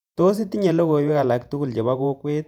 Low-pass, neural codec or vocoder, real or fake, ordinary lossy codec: 19.8 kHz; vocoder, 48 kHz, 128 mel bands, Vocos; fake; none